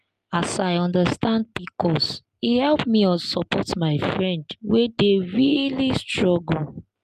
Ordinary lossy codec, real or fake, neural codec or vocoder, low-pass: Opus, 32 kbps; real; none; 14.4 kHz